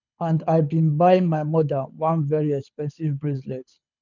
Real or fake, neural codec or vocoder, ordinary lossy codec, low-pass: fake; codec, 24 kHz, 6 kbps, HILCodec; none; 7.2 kHz